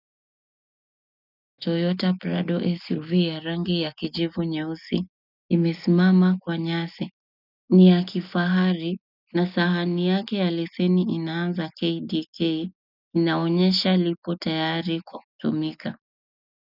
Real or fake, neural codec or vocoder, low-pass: real; none; 5.4 kHz